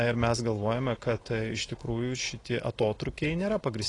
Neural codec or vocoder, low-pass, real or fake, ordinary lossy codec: none; 10.8 kHz; real; AAC, 32 kbps